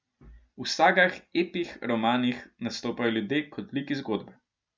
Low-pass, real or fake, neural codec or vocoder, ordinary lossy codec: none; real; none; none